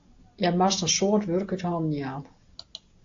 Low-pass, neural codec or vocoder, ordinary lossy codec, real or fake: 7.2 kHz; none; AAC, 48 kbps; real